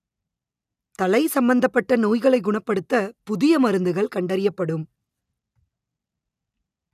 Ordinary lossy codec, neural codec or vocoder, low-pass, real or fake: none; none; 14.4 kHz; real